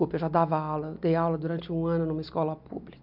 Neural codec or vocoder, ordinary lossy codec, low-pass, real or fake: none; none; 5.4 kHz; real